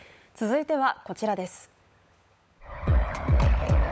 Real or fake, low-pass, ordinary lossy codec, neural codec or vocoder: fake; none; none; codec, 16 kHz, 16 kbps, FunCodec, trained on LibriTTS, 50 frames a second